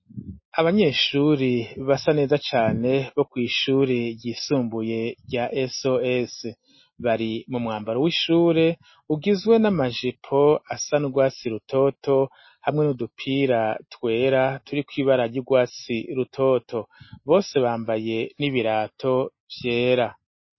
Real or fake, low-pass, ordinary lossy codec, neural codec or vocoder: real; 7.2 kHz; MP3, 24 kbps; none